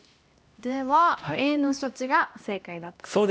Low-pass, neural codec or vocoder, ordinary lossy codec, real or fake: none; codec, 16 kHz, 1 kbps, X-Codec, HuBERT features, trained on LibriSpeech; none; fake